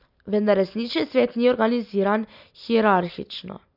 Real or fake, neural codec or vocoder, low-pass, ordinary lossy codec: real; none; 5.4 kHz; none